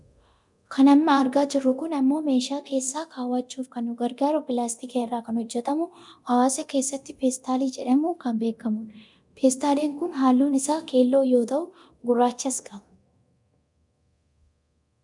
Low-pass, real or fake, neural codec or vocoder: 10.8 kHz; fake; codec, 24 kHz, 0.9 kbps, DualCodec